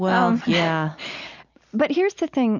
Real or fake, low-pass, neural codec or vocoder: real; 7.2 kHz; none